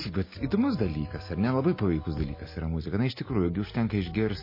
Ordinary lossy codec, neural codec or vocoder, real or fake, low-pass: MP3, 24 kbps; none; real; 5.4 kHz